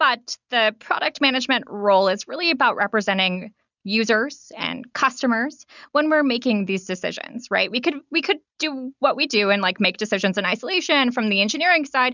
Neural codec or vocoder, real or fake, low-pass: none; real; 7.2 kHz